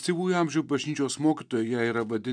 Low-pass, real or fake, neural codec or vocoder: 9.9 kHz; real; none